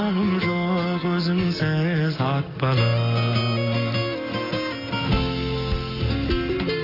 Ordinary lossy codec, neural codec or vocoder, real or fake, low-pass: AAC, 24 kbps; none; real; 5.4 kHz